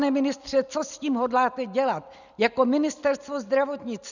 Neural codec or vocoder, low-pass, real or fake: none; 7.2 kHz; real